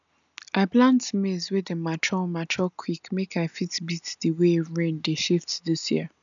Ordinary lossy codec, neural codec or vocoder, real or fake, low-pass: none; none; real; 7.2 kHz